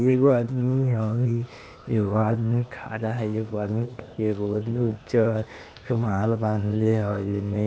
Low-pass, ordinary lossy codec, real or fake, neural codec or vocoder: none; none; fake; codec, 16 kHz, 0.8 kbps, ZipCodec